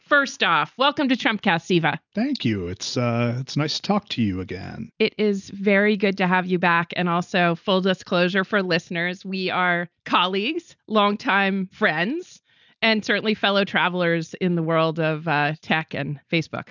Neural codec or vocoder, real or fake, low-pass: none; real; 7.2 kHz